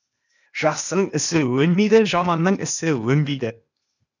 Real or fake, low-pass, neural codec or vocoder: fake; 7.2 kHz; codec, 16 kHz, 0.8 kbps, ZipCodec